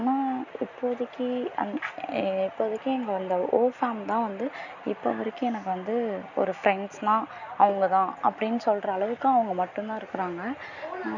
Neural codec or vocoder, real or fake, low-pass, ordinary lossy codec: none; real; 7.2 kHz; none